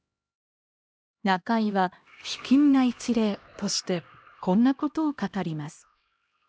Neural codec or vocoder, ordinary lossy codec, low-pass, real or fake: codec, 16 kHz, 1 kbps, X-Codec, HuBERT features, trained on LibriSpeech; none; none; fake